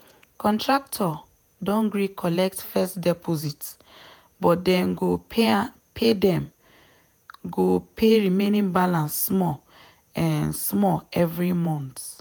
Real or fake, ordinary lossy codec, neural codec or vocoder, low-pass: fake; none; vocoder, 48 kHz, 128 mel bands, Vocos; none